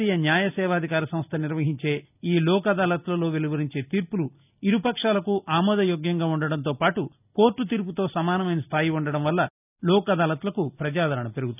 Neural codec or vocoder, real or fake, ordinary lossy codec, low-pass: none; real; none; 3.6 kHz